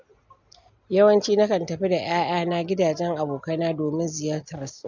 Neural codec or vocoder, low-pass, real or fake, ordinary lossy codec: none; 7.2 kHz; real; MP3, 64 kbps